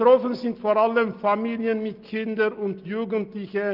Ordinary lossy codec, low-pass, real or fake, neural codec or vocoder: Opus, 24 kbps; 5.4 kHz; real; none